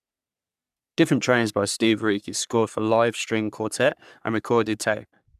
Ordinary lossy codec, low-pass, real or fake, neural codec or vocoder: none; 14.4 kHz; fake; codec, 44.1 kHz, 3.4 kbps, Pupu-Codec